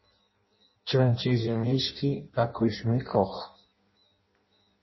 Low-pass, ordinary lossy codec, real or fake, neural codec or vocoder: 7.2 kHz; MP3, 24 kbps; fake; codec, 16 kHz in and 24 kHz out, 0.6 kbps, FireRedTTS-2 codec